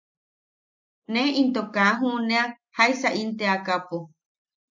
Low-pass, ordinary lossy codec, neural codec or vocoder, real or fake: 7.2 kHz; MP3, 64 kbps; none; real